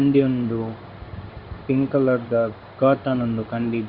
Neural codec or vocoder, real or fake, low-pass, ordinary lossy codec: codec, 16 kHz in and 24 kHz out, 1 kbps, XY-Tokenizer; fake; 5.4 kHz; Opus, 64 kbps